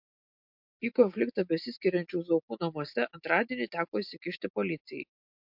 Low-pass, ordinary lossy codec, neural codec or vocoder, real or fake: 5.4 kHz; MP3, 48 kbps; none; real